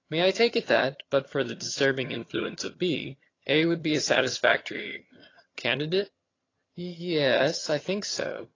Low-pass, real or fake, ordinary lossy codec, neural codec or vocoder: 7.2 kHz; fake; AAC, 32 kbps; vocoder, 22.05 kHz, 80 mel bands, HiFi-GAN